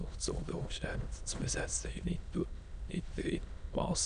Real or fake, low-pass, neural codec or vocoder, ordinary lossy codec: fake; 9.9 kHz; autoencoder, 22.05 kHz, a latent of 192 numbers a frame, VITS, trained on many speakers; none